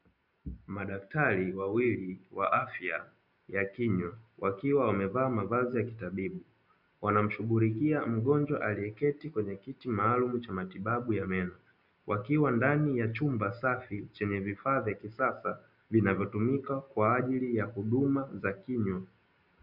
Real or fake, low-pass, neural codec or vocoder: real; 5.4 kHz; none